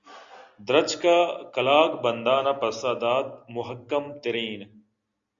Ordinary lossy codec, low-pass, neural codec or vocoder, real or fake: Opus, 64 kbps; 7.2 kHz; none; real